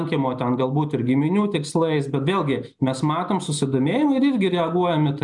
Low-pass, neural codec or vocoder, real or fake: 10.8 kHz; none; real